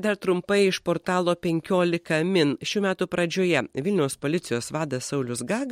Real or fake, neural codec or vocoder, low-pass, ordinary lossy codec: real; none; 19.8 kHz; MP3, 64 kbps